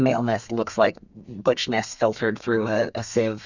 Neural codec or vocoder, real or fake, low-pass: codec, 32 kHz, 1.9 kbps, SNAC; fake; 7.2 kHz